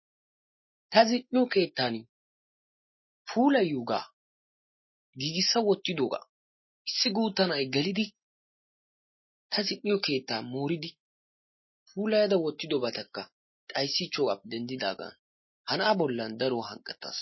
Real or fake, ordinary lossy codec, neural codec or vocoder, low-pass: real; MP3, 24 kbps; none; 7.2 kHz